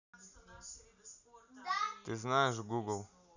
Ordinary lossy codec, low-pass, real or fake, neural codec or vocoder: none; 7.2 kHz; real; none